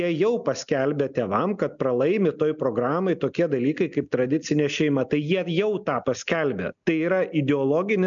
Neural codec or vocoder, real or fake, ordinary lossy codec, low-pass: none; real; MP3, 96 kbps; 7.2 kHz